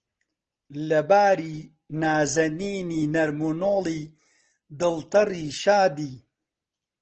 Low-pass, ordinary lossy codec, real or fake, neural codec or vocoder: 7.2 kHz; Opus, 16 kbps; real; none